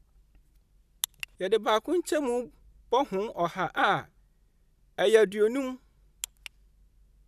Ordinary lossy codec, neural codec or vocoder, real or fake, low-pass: none; none; real; 14.4 kHz